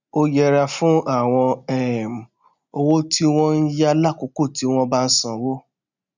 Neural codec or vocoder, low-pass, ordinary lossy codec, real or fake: none; 7.2 kHz; none; real